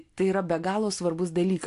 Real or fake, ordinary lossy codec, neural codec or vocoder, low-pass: real; AAC, 64 kbps; none; 10.8 kHz